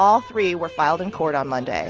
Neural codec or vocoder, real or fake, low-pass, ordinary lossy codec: none; real; 7.2 kHz; Opus, 24 kbps